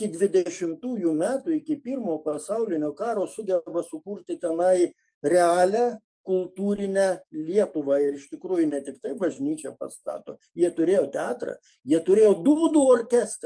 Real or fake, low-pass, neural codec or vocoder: fake; 9.9 kHz; codec, 44.1 kHz, 7.8 kbps, DAC